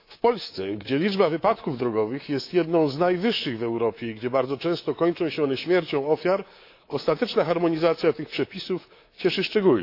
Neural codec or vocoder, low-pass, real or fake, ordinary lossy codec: codec, 24 kHz, 3.1 kbps, DualCodec; 5.4 kHz; fake; AAC, 32 kbps